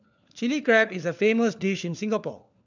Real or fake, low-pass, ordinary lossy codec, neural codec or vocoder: fake; 7.2 kHz; none; codec, 16 kHz, 4 kbps, FunCodec, trained on LibriTTS, 50 frames a second